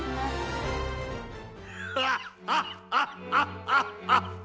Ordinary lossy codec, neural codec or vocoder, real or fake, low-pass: none; none; real; none